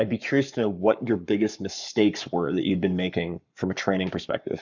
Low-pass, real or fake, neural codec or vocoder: 7.2 kHz; fake; codec, 44.1 kHz, 7.8 kbps, Pupu-Codec